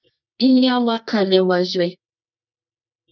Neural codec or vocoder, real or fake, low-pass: codec, 24 kHz, 0.9 kbps, WavTokenizer, medium music audio release; fake; 7.2 kHz